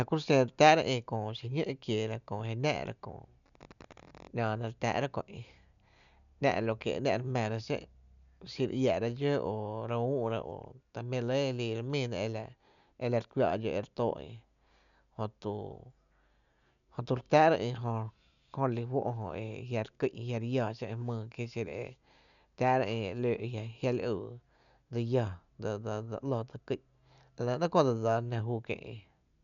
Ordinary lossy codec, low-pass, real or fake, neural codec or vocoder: none; 7.2 kHz; real; none